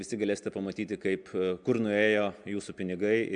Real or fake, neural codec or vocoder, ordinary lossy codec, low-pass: real; none; MP3, 96 kbps; 9.9 kHz